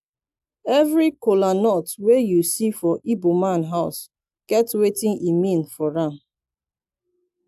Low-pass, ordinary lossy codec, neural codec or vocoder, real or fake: 14.4 kHz; AAC, 96 kbps; none; real